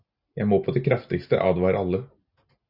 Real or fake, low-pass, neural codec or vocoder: real; 5.4 kHz; none